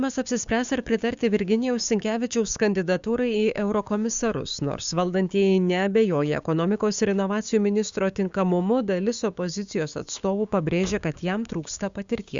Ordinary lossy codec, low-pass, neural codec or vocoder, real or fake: Opus, 64 kbps; 7.2 kHz; codec, 16 kHz, 6 kbps, DAC; fake